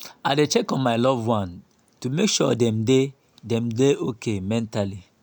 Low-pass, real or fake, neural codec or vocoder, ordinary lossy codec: none; real; none; none